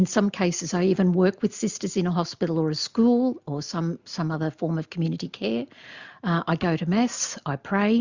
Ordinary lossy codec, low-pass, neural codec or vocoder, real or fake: Opus, 64 kbps; 7.2 kHz; none; real